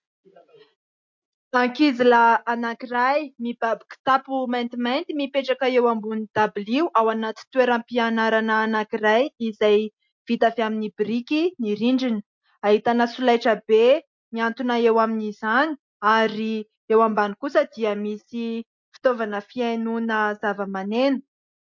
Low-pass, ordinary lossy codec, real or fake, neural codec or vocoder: 7.2 kHz; MP3, 48 kbps; real; none